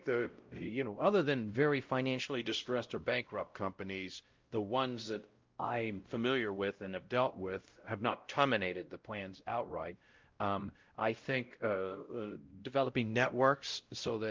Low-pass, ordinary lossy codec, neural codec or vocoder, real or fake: 7.2 kHz; Opus, 32 kbps; codec, 16 kHz, 0.5 kbps, X-Codec, WavLM features, trained on Multilingual LibriSpeech; fake